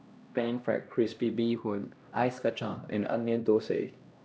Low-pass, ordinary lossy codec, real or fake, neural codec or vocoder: none; none; fake; codec, 16 kHz, 1 kbps, X-Codec, HuBERT features, trained on LibriSpeech